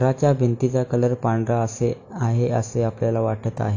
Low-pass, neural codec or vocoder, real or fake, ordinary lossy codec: 7.2 kHz; none; real; AAC, 48 kbps